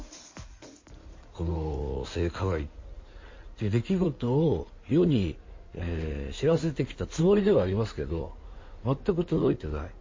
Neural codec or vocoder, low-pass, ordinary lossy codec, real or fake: codec, 16 kHz in and 24 kHz out, 2.2 kbps, FireRedTTS-2 codec; 7.2 kHz; MP3, 32 kbps; fake